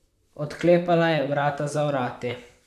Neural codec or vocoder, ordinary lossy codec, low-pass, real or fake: vocoder, 44.1 kHz, 128 mel bands, Pupu-Vocoder; none; 14.4 kHz; fake